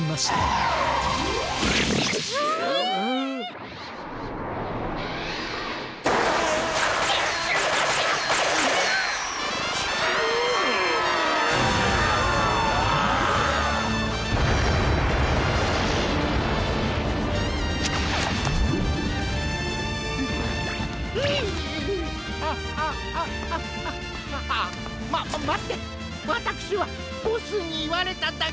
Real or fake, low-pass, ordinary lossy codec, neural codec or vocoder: real; none; none; none